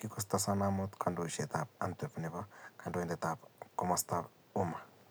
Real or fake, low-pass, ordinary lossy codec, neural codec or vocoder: real; none; none; none